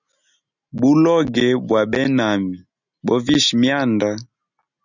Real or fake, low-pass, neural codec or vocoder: real; 7.2 kHz; none